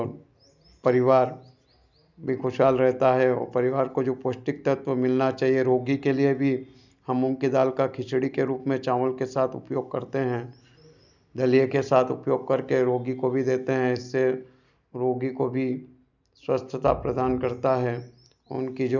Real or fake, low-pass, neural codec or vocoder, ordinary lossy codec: real; 7.2 kHz; none; none